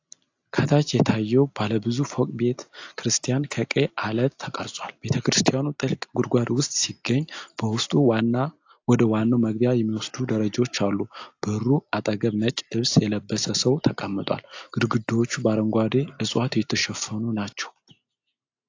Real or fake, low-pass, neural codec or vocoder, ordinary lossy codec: real; 7.2 kHz; none; AAC, 48 kbps